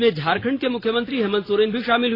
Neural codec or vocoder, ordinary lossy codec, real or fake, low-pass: none; AAC, 24 kbps; real; 5.4 kHz